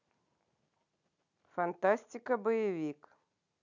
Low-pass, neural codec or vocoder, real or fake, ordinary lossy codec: 7.2 kHz; none; real; none